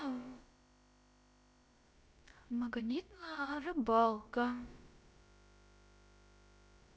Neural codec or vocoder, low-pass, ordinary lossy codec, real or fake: codec, 16 kHz, about 1 kbps, DyCAST, with the encoder's durations; none; none; fake